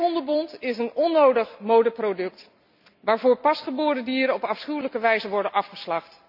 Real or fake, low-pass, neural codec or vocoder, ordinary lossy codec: real; 5.4 kHz; none; none